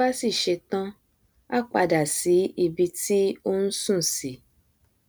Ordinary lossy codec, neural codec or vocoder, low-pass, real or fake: none; none; none; real